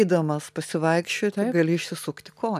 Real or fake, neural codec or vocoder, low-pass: real; none; 14.4 kHz